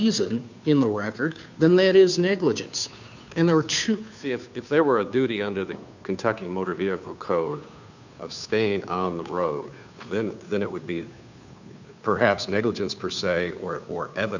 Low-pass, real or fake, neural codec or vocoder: 7.2 kHz; fake; codec, 16 kHz, 2 kbps, FunCodec, trained on Chinese and English, 25 frames a second